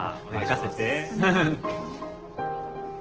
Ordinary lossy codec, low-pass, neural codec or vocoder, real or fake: Opus, 16 kbps; 7.2 kHz; none; real